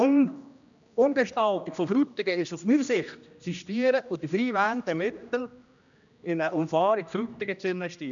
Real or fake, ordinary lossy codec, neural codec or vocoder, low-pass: fake; none; codec, 16 kHz, 1 kbps, X-Codec, HuBERT features, trained on general audio; 7.2 kHz